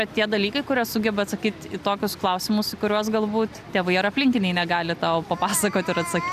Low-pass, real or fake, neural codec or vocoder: 14.4 kHz; real; none